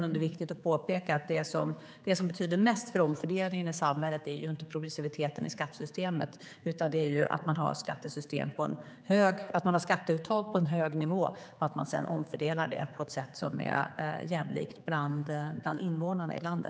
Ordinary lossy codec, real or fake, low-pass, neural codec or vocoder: none; fake; none; codec, 16 kHz, 4 kbps, X-Codec, HuBERT features, trained on general audio